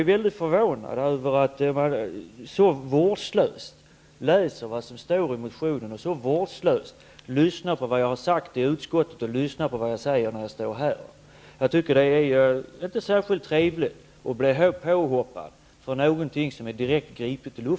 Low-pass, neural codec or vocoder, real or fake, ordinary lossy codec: none; none; real; none